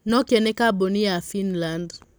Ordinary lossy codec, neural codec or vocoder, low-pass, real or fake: none; none; none; real